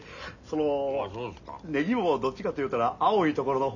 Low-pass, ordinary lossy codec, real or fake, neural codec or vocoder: 7.2 kHz; none; real; none